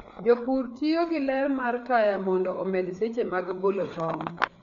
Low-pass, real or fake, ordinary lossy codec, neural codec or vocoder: 7.2 kHz; fake; none; codec, 16 kHz, 4 kbps, FreqCodec, larger model